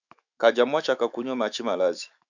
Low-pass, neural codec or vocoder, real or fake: 7.2 kHz; autoencoder, 48 kHz, 128 numbers a frame, DAC-VAE, trained on Japanese speech; fake